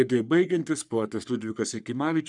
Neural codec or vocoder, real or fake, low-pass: codec, 44.1 kHz, 3.4 kbps, Pupu-Codec; fake; 10.8 kHz